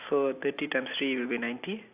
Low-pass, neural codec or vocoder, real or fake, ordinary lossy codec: 3.6 kHz; none; real; AAC, 32 kbps